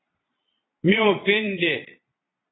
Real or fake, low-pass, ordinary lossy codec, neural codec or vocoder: fake; 7.2 kHz; AAC, 16 kbps; vocoder, 22.05 kHz, 80 mel bands, Vocos